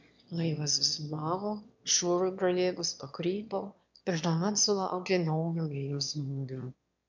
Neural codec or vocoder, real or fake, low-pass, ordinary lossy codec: autoencoder, 22.05 kHz, a latent of 192 numbers a frame, VITS, trained on one speaker; fake; 7.2 kHz; MP3, 64 kbps